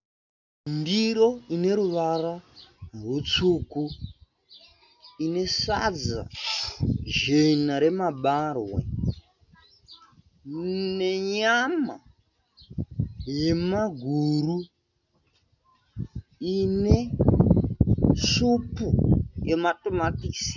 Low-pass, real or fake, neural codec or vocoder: 7.2 kHz; real; none